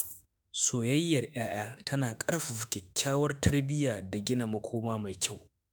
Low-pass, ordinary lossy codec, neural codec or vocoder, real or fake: none; none; autoencoder, 48 kHz, 32 numbers a frame, DAC-VAE, trained on Japanese speech; fake